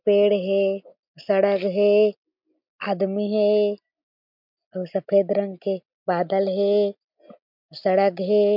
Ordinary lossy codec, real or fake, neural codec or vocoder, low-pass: MP3, 48 kbps; real; none; 5.4 kHz